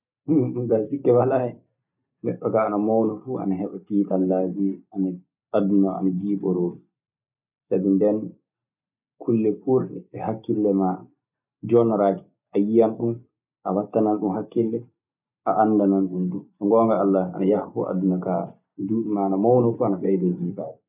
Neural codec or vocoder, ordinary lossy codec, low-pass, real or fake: none; none; 3.6 kHz; real